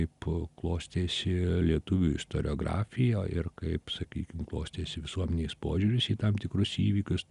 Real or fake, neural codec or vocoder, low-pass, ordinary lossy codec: real; none; 10.8 kHz; Opus, 64 kbps